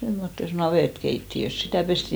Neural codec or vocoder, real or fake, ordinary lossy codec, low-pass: none; real; none; none